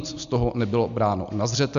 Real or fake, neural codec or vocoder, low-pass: real; none; 7.2 kHz